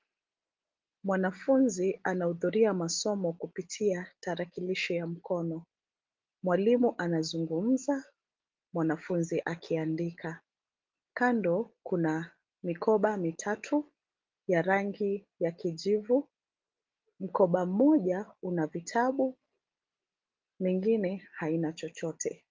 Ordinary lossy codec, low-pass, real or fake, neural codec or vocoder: Opus, 32 kbps; 7.2 kHz; real; none